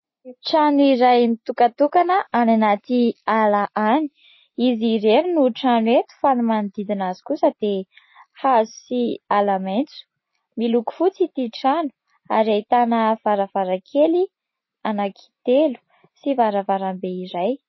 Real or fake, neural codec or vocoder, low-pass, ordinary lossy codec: real; none; 7.2 kHz; MP3, 24 kbps